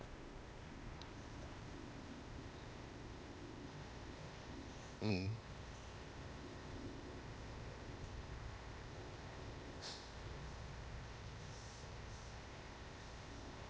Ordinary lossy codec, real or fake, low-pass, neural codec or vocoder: none; fake; none; codec, 16 kHz, 0.8 kbps, ZipCodec